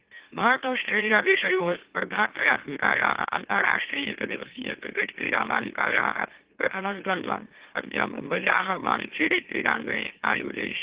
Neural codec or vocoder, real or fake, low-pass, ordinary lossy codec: autoencoder, 44.1 kHz, a latent of 192 numbers a frame, MeloTTS; fake; 3.6 kHz; Opus, 16 kbps